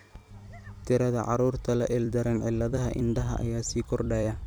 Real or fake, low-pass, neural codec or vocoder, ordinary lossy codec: fake; none; vocoder, 44.1 kHz, 128 mel bands every 256 samples, BigVGAN v2; none